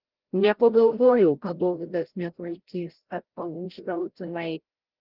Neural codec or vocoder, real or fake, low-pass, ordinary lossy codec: codec, 16 kHz, 0.5 kbps, FreqCodec, larger model; fake; 5.4 kHz; Opus, 16 kbps